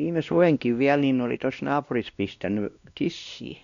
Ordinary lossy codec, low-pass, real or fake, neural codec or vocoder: none; 7.2 kHz; fake; codec, 16 kHz, 1 kbps, X-Codec, WavLM features, trained on Multilingual LibriSpeech